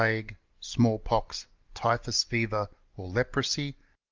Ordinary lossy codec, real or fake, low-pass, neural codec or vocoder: Opus, 32 kbps; real; 7.2 kHz; none